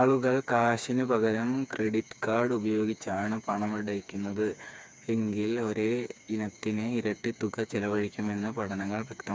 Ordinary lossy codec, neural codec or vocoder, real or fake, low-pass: none; codec, 16 kHz, 4 kbps, FreqCodec, smaller model; fake; none